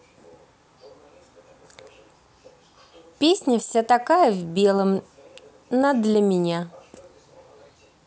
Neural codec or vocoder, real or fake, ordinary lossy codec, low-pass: none; real; none; none